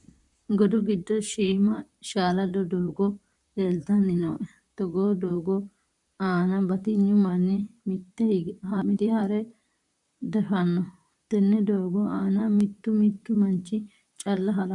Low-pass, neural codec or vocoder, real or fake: 10.8 kHz; vocoder, 44.1 kHz, 128 mel bands, Pupu-Vocoder; fake